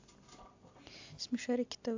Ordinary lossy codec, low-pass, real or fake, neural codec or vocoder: AAC, 48 kbps; 7.2 kHz; real; none